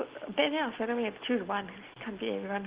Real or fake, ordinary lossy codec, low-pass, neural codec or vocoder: real; Opus, 16 kbps; 3.6 kHz; none